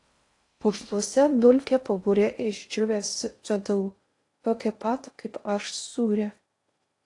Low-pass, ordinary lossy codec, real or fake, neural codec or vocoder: 10.8 kHz; AAC, 48 kbps; fake; codec, 16 kHz in and 24 kHz out, 0.6 kbps, FocalCodec, streaming, 4096 codes